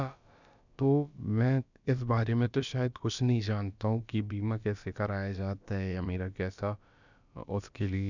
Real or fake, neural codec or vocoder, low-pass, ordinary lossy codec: fake; codec, 16 kHz, about 1 kbps, DyCAST, with the encoder's durations; 7.2 kHz; none